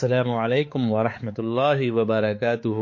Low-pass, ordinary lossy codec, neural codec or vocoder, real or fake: 7.2 kHz; MP3, 32 kbps; codec, 16 kHz, 4 kbps, X-Codec, HuBERT features, trained on balanced general audio; fake